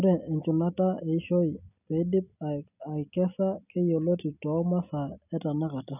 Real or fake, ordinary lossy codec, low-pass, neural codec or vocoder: real; none; 3.6 kHz; none